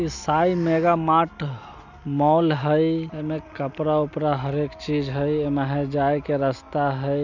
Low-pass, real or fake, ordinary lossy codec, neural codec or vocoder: 7.2 kHz; real; none; none